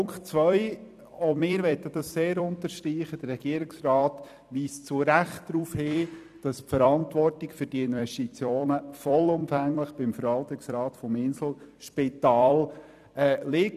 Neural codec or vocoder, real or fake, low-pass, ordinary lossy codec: vocoder, 44.1 kHz, 128 mel bands every 256 samples, BigVGAN v2; fake; 14.4 kHz; none